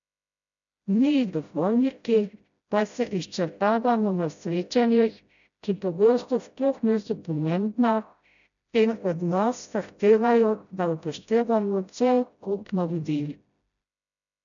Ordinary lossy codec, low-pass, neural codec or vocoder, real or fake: none; 7.2 kHz; codec, 16 kHz, 0.5 kbps, FreqCodec, smaller model; fake